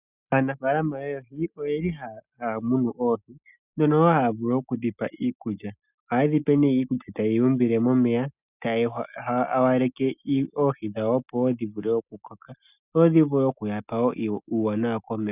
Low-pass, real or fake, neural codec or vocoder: 3.6 kHz; real; none